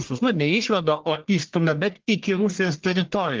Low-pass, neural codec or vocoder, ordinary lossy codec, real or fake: 7.2 kHz; codec, 44.1 kHz, 1.7 kbps, Pupu-Codec; Opus, 16 kbps; fake